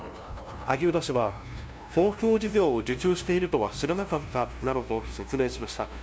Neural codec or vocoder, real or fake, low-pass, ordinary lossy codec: codec, 16 kHz, 0.5 kbps, FunCodec, trained on LibriTTS, 25 frames a second; fake; none; none